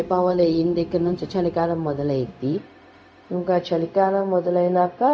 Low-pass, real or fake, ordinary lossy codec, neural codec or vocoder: none; fake; none; codec, 16 kHz, 0.4 kbps, LongCat-Audio-Codec